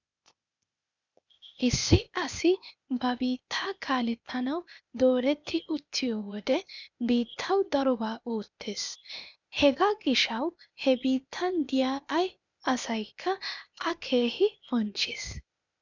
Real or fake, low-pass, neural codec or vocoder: fake; 7.2 kHz; codec, 16 kHz, 0.8 kbps, ZipCodec